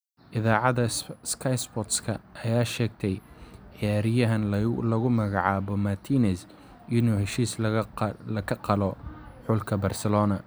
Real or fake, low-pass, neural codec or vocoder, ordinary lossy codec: real; none; none; none